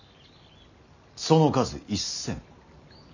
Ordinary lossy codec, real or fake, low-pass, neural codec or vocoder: none; real; 7.2 kHz; none